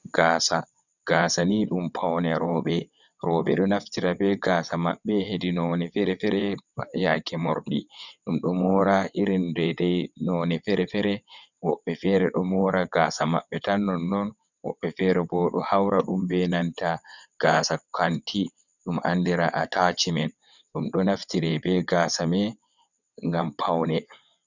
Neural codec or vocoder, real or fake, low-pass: vocoder, 22.05 kHz, 80 mel bands, WaveNeXt; fake; 7.2 kHz